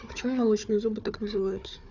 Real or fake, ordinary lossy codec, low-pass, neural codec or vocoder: fake; none; 7.2 kHz; codec, 16 kHz, 4 kbps, FunCodec, trained on Chinese and English, 50 frames a second